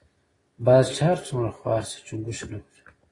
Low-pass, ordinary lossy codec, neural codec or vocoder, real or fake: 10.8 kHz; AAC, 32 kbps; none; real